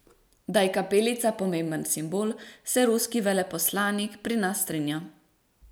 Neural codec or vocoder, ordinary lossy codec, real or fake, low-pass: none; none; real; none